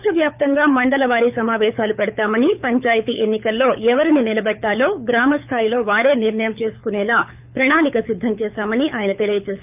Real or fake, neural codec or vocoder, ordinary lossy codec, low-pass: fake; codec, 24 kHz, 6 kbps, HILCodec; none; 3.6 kHz